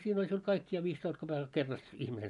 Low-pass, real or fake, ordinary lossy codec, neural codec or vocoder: 10.8 kHz; real; none; none